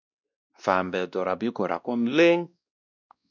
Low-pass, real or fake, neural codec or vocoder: 7.2 kHz; fake; codec, 16 kHz, 1 kbps, X-Codec, WavLM features, trained on Multilingual LibriSpeech